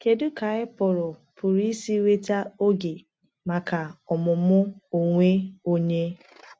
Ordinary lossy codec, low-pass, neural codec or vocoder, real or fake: none; none; none; real